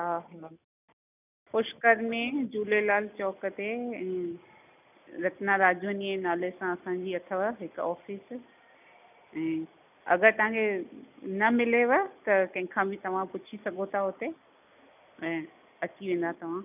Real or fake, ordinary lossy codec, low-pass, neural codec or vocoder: real; none; 3.6 kHz; none